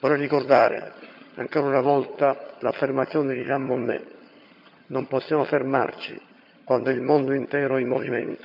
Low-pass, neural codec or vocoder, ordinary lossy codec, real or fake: 5.4 kHz; vocoder, 22.05 kHz, 80 mel bands, HiFi-GAN; none; fake